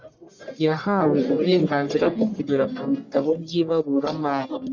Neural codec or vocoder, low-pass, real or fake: codec, 44.1 kHz, 1.7 kbps, Pupu-Codec; 7.2 kHz; fake